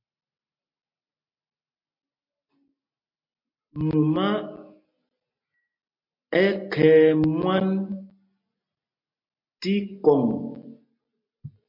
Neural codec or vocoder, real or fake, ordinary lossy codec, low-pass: none; real; MP3, 32 kbps; 5.4 kHz